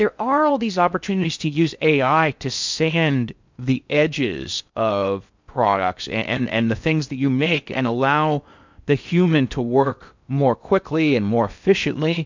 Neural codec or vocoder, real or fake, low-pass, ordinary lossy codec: codec, 16 kHz in and 24 kHz out, 0.6 kbps, FocalCodec, streaming, 4096 codes; fake; 7.2 kHz; MP3, 64 kbps